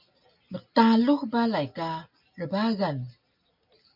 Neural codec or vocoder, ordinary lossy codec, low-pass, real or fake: none; MP3, 48 kbps; 5.4 kHz; real